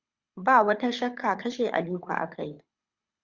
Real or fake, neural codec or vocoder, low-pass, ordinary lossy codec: fake; codec, 24 kHz, 6 kbps, HILCodec; 7.2 kHz; Opus, 64 kbps